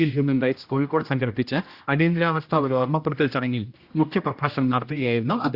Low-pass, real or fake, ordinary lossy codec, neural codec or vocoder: 5.4 kHz; fake; Opus, 64 kbps; codec, 16 kHz, 1 kbps, X-Codec, HuBERT features, trained on general audio